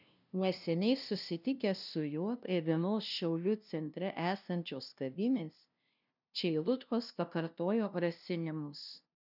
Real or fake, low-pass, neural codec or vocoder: fake; 5.4 kHz; codec, 16 kHz, 0.5 kbps, FunCodec, trained on LibriTTS, 25 frames a second